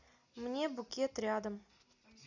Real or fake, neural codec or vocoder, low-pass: real; none; 7.2 kHz